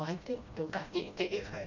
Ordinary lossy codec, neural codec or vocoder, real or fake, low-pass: none; codec, 16 kHz, 1 kbps, FreqCodec, smaller model; fake; 7.2 kHz